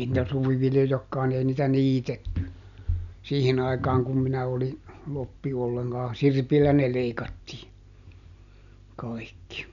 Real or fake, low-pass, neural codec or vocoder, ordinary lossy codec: real; 7.2 kHz; none; none